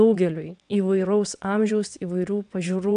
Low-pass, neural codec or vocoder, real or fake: 9.9 kHz; vocoder, 22.05 kHz, 80 mel bands, WaveNeXt; fake